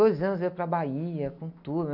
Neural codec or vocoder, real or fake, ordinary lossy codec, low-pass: none; real; none; 5.4 kHz